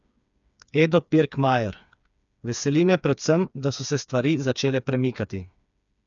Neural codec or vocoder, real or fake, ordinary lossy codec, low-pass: codec, 16 kHz, 4 kbps, FreqCodec, smaller model; fake; none; 7.2 kHz